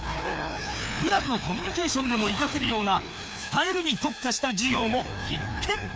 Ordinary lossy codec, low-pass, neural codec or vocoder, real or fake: none; none; codec, 16 kHz, 2 kbps, FreqCodec, larger model; fake